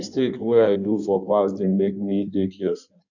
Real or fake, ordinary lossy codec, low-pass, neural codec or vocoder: fake; none; 7.2 kHz; codec, 16 kHz in and 24 kHz out, 1.1 kbps, FireRedTTS-2 codec